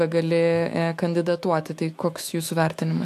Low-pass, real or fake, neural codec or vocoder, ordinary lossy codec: 14.4 kHz; fake; autoencoder, 48 kHz, 128 numbers a frame, DAC-VAE, trained on Japanese speech; AAC, 64 kbps